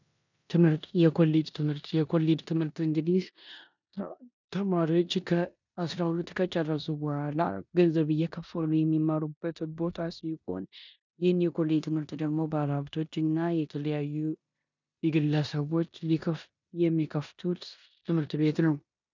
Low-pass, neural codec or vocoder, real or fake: 7.2 kHz; codec, 16 kHz in and 24 kHz out, 0.9 kbps, LongCat-Audio-Codec, four codebook decoder; fake